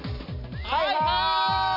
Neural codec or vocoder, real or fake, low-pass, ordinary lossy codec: none; real; 5.4 kHz; none